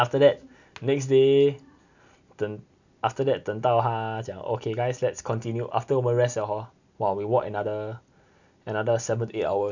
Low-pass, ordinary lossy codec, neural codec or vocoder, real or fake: 7.2 kHz; none; none; real